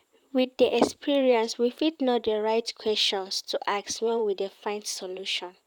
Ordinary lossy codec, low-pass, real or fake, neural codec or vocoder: none; 19.8 kHz; fake; vocoder, 44.1 kHz, 128 mel bands, Pupu-Vocoder